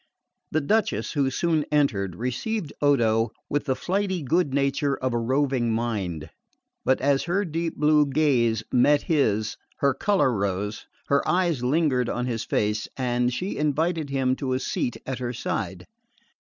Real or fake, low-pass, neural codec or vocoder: real; 7.2 kHz; none